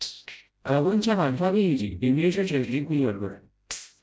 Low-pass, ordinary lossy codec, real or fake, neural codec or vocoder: none; none; fake; codec, 16 kHz, 0.5 kbps, FreqCodec, smaller model